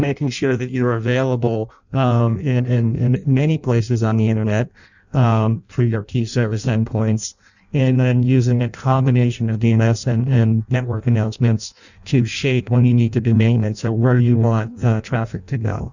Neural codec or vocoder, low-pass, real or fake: codec, 16 kHz in and 24 kHz out, 0.6 kbps, FireRedTTS-2 codec; 7.2 kHz; fake